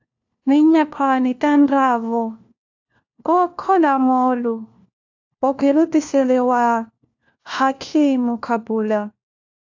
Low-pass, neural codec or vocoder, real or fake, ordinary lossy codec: 7.2 kHz; codec, 16 kHz, 1 kbps, FunCodec, trained on LibriTTS, 50 frames a second; fake; AAC, 48 kbps